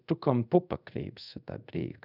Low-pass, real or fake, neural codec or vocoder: 5.4 kHz; fake; codec, 24 kHz, 0.5 kbps, DualCodec